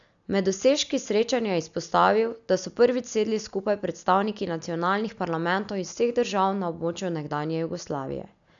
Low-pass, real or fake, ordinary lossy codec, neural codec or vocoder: 7.2 kHz; real; none; none